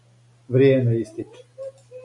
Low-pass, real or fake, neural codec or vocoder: 10.8 kHz; real; none